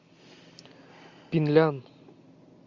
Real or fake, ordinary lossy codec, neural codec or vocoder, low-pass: real; MP3, 64 kbps; none; 7.2 kHz